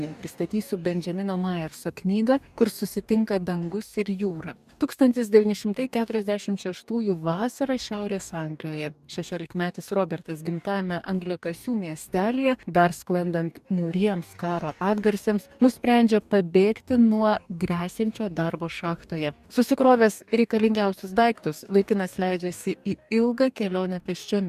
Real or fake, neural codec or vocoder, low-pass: fake; codec, 44.1 kHz, 2.6 kbps, DAC; 14.4 kHz